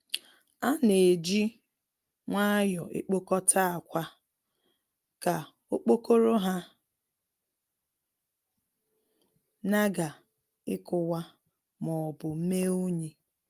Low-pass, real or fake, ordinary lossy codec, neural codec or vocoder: 14.4 kHz; real; Opus, 32 kbps; none